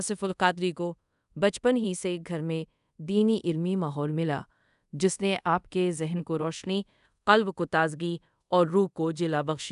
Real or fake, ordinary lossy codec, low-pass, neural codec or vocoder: fake; none; 10.8 kHz; codec, 24 kHz, 0.5 kbps, DualCodec